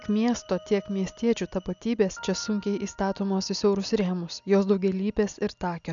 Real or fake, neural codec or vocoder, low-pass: real; none; 7.2 kHz